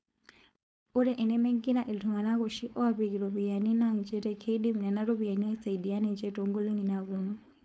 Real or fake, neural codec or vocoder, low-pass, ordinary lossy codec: fake; codec, 16 kHz, 4.8 kbps, FACodec; none; none